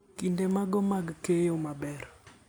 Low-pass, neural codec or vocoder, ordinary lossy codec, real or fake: none; none; none; real